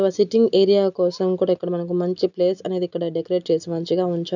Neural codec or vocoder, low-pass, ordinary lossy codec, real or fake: none; 7.2 kHz; none; real